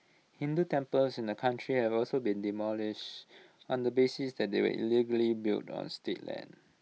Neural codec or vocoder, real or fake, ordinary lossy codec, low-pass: none; real; none; none